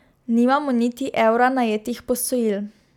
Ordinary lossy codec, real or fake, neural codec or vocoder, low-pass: none; real; none; 19.8 kHz